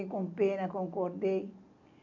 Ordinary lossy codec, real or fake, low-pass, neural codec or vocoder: none; real; 7.2 kHz; none